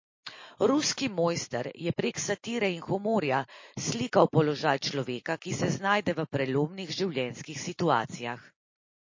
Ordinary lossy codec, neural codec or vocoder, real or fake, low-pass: MP3, 32 kbps; none; real; 7.2 kHz